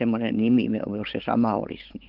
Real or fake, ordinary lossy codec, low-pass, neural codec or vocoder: fake; Opus, 16 kbps; 5.4 kHz; codec, 16 kHz, 16 kbps, FunCodec, trained on Chinese and English, 50 frames a second